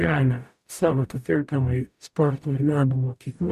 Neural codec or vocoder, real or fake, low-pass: codec, 44.1 kHz, 0.9 kbps, DAC; fake; 14.4 kHz